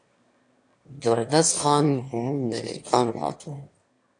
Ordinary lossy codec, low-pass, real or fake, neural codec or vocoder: AAC, 64 kbps; 9.9 kHz; fake; autoencoder, 22.05 kHz, a latent of 192 numbers a frame, VITS, trained on one speaker